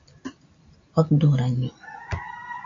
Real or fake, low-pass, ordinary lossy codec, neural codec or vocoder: real; 7.2 kHz; AAC, 48 kbps; none